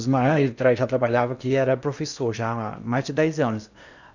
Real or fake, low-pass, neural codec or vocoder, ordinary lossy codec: fake; 7.2 kHz; codec, 16 kHz in and 24 kHz out, 0.8 kbps, FocalCodec, streaming, 65536 codes; none